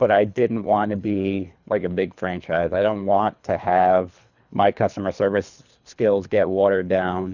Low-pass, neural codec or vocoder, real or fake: 7.2 kHz; codec, 24 kHz, 3 kbps, HILCodec; fake